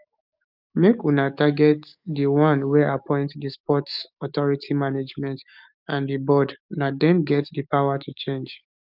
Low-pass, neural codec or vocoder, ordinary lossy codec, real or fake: 5.4 kHz; codec, 44.1 kHz, 7.8 kbps, DAC; none; fake